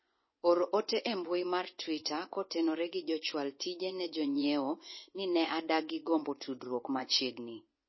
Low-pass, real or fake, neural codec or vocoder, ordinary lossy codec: 7.2 kHz; fake; vocoder, 24 kHz, 100 mel bands, Vocos; MP3, 24 kbps